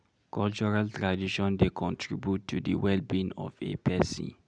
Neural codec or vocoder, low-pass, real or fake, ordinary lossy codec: none; 9.9 kHz; real; none